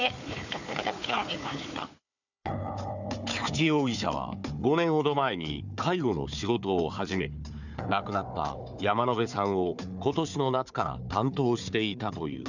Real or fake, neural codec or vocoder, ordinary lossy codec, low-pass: fake; codec, 16 kHz, 4 kbps, FunCodec, trained on Chinese and English, 50 frames a second; none; 7.2 kHz